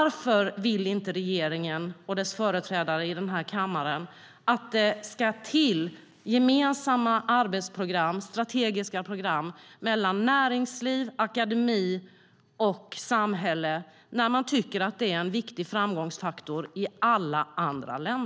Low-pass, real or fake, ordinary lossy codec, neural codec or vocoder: none; real; none; none